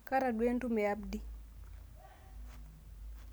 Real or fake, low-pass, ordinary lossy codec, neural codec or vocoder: real; none; none; none